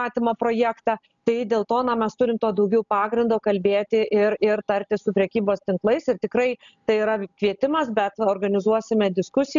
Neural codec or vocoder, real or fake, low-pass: none; real; 7.2 kHz